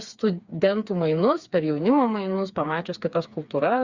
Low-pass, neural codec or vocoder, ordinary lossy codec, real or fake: 7.2 kHz; codec, 16 kHz, 4 kbps, FreqCodec, smaller model; Opus, 64 kbps; fake